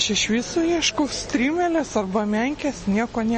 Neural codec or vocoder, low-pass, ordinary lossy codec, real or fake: none; 10.8 kHz; MP3, 32 kbps; real